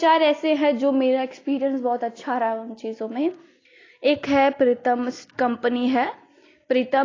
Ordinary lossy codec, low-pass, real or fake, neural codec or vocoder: AAC, 32 kbps; 7.2 kHz; real; none